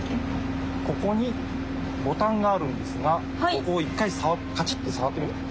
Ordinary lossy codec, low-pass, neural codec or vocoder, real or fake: none; none; none; real